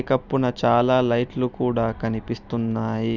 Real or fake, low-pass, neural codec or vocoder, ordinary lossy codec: real; 7.2 kHz; none; none